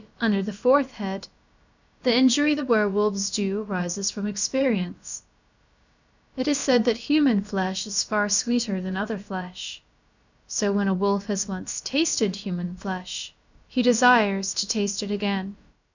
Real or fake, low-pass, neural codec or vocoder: fake; 7.2 kHz; codec, 16 kHz, about 1 kbps, DyCAST, with the encoder's durations